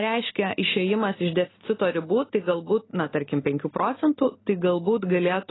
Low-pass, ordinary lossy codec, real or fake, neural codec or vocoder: 7.2 kHz; AAC, 16 kbps; real; none